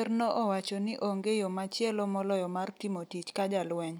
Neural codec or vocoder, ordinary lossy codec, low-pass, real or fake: none; none; none; real